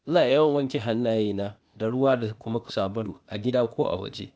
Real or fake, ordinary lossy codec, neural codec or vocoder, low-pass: fake; none; codec, 16 kHz, 0.8 kbps, ZipCodec; none